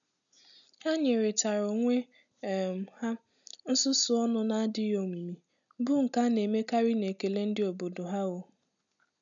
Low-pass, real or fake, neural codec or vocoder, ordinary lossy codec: 7.2 kHz; real; none; none